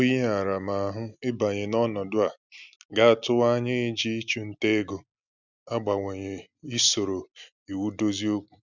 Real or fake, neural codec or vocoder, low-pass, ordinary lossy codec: real; none; 7.2 kHz; none